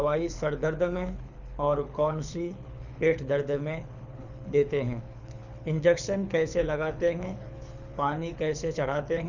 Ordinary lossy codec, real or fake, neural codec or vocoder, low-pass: none; fake; codec, 24 kHz, 6 kbps, HILCodec; 7.2 kHz